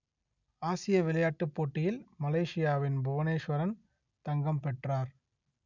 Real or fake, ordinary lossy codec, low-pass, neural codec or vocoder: real; none; 7.2 kHz; none